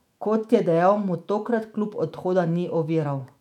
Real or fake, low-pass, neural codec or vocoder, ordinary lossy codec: fake; 19.8 kHz; autoencoder, 48 kHz, 128 numbers a frame, DAC-VAE, trained on Japanese speech; none